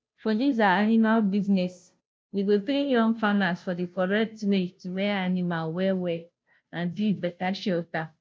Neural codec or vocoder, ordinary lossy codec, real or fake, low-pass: codec, 16 kHz, 0.5 kbps, FunCodec, trained on Chinese and English, 25 frames a second; none; fake; none